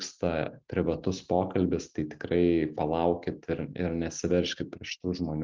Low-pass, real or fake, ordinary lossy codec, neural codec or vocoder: 7.2 kHz; real; Opus, 32 kbps; none